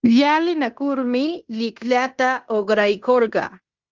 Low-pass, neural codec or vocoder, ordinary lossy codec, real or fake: 7.2 kHz; codec, 16 kHz in and 24 kHz out, 0.9 kbps, LongCat-Audio-Codec, fine tuned four codebook decoder; Opus, 32 kbps; fake